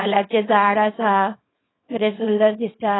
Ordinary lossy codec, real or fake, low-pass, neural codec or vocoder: AAC, 16 kbps; fake; 7.2 kHz; codec, 24 kHz, 0.9 kbps, WavTokenizer, small release